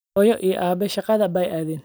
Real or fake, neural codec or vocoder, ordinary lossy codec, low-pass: real; none; none; none